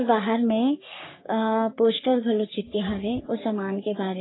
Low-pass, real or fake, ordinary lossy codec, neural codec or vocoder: 7.2 kHz; fake; AAC, 16 kbps; codec, 44.1 kHz, 3.4 kbps, Pupu-Codec